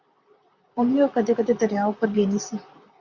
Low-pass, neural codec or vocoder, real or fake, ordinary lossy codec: 7.2 kHz; vocoder, 44.1 kHz, 128 mel bands, Pupu-Vocoder; fake; Opus, 64 kbps